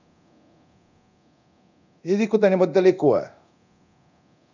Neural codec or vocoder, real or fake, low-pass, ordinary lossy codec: codec, 24 kHz, 0.9 kbps, DualCodec; fake; 7.2 kHz; none